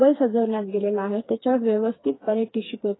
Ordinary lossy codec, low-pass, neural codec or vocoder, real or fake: AAC, 16 kbps; 7.2 kHz; codec, 16 kHz, 4 kbps, FreqCodec, smaller model; fake